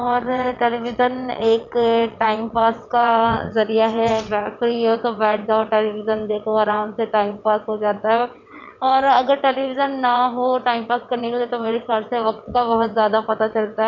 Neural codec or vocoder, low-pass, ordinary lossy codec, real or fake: vocoder, 22.05 kHz, 80 mel bands, WaveNeXt; 7.2 kHz; none; fake